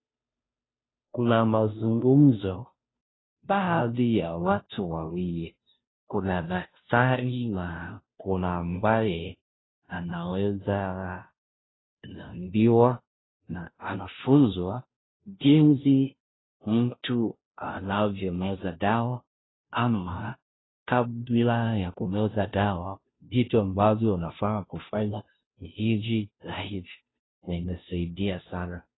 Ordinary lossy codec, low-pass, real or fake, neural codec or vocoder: AAC, 16 kbps; 7.2 kHz; fake; codec, 16 kHz, 0.5 kbps, FunCodec, trained on Chinese and English, 25 frames a second